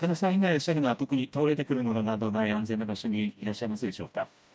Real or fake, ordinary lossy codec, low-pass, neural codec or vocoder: fake; none; none; codec, 16 kHz, 1 kbps, FreqCodec, smaller model